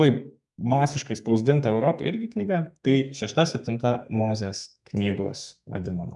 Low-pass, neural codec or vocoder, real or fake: 10.8 kHz; codec, 32 kHz, 1.9 kbps, SNAC; fake